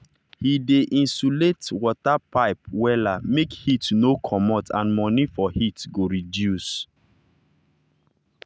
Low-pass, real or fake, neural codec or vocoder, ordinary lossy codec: none; real; none; none